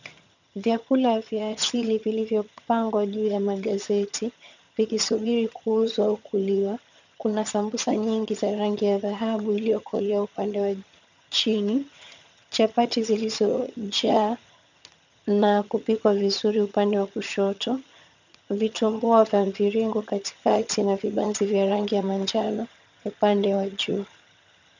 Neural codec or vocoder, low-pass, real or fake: vocoder, 22.05 kHz, 80 mel bands, HiFi-GAN; 7.2 kHz; fake